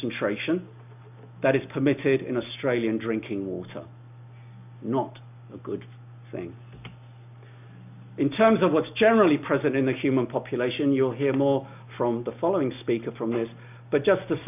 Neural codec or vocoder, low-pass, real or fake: none; 3.6 kHz; real